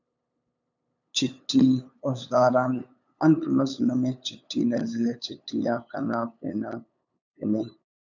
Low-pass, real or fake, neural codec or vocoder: 7.2 kHz; fake; codec, 16 kHz, 8 kbps, FunCodec, trained on LibriTTS, 25 frames a second